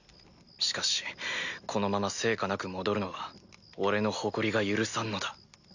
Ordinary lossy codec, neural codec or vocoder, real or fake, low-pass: none; none; real; 7.2 kHz